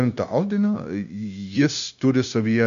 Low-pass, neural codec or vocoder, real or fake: 7.2 kHz; codec, 16 kHz, 0.9 kbps, LongCat-Audio-Codec; fake